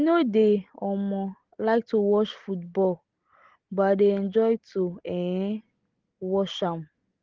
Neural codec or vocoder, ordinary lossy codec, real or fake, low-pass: none; Opus, 16 kbps; real; 7.2 kHz